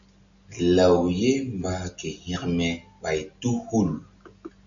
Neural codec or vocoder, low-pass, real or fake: none; 7.2 kHz; real